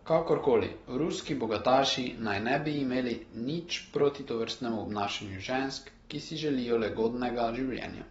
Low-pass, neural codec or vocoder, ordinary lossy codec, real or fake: 19.8 kHz; none; AAC, 24 kbps; real